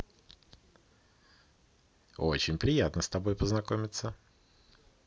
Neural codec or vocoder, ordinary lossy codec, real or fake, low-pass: none; none; real; none